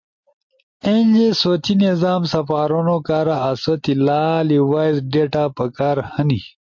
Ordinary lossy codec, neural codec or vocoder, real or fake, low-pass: MP3, 48 kbps; none; real; 7.2 kHz